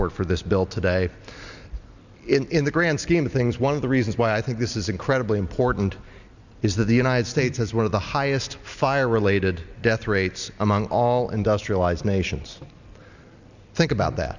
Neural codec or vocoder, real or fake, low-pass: none; real; 7.2 kHz